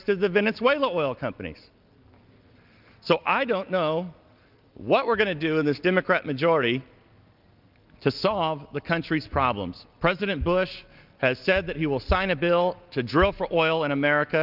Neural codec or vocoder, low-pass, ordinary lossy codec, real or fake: none; 5.4 kHz; Opus, 24 kbps; real